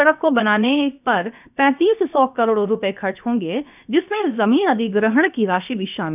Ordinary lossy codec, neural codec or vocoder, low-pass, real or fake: none; codec, 16 kHz, about 1 kbps, DyCAST, with the encoder's durations; 3.6 kHz; fake